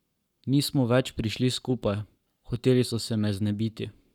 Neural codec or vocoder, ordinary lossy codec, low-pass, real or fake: codec, 44.1 kHz, 7.8 kbps, Pupu-Codec; none; 19.8 kHz; fake